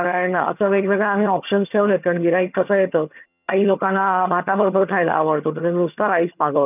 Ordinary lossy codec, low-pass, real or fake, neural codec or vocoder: none; 3.6 kHz; fake; vocoder, 22.05 kHz, 80 mel bands, HiFi-GAN